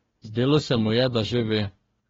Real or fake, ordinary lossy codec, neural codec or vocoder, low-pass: fake; AAC, 24 kbps; codec, 16 kHz, 1 kbps, FunCodec, trained on Chinese and English, 50 frames a second; 7.2 kHz